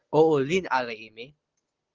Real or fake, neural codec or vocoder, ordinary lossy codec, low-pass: real; none; Opus, 16 kbps; 7.2 kHz